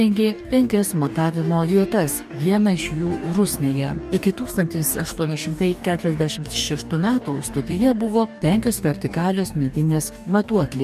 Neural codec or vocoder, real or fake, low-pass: codec, 44.1 kHz, 2.6 kbps, DAC; fake; 14.4 kHz